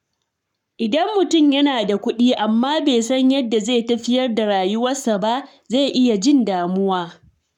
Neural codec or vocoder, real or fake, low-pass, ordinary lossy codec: codec, 44.1 kHz, 7.8 kbps, Pupu-Codec; fake; 19.8 kHz; none